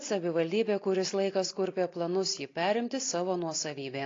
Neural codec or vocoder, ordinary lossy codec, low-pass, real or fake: none; AAC, 32 kbps; 7.2 kHz; real